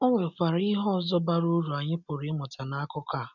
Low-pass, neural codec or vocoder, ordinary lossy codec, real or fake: 7.2 kHz; none; none; real